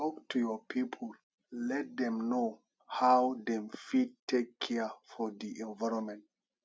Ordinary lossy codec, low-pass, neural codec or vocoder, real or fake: none; none; none; real